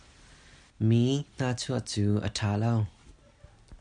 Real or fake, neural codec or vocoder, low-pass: real; none; 9.9 kHz